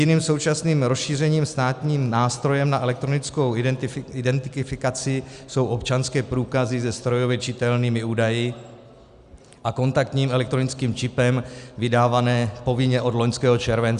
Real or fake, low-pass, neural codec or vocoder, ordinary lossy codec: real; 10.8 kHz; none; Opus, 64 kbps